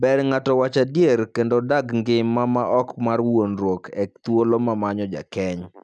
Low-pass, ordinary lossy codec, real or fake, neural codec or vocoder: none; none; real; none